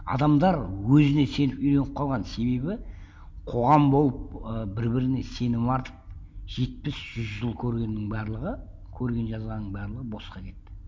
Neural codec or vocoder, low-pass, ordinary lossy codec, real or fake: none; none; none; real